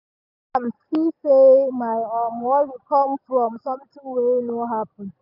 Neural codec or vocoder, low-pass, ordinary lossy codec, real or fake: none; 5.4 kHz; none; real